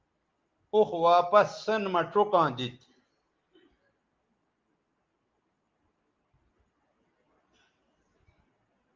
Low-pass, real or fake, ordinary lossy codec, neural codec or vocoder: 7.2 kHz; real; Opus, 32 kbps; none